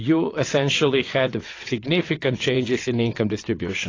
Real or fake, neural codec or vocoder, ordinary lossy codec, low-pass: fake; vocoder, 22.05 kHz, 80 mel bands, WaveNeXt; AAC, 32 kbps; 7.2 kHz